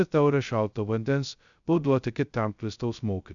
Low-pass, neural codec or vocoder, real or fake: 7.2 kHz; codec, 16 kHz, 0.2 kbps, FocalCodec; fake